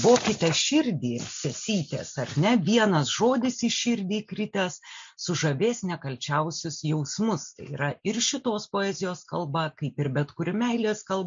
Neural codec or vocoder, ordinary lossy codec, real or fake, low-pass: none; MP3, 48 kbps; real; 7.2 kHz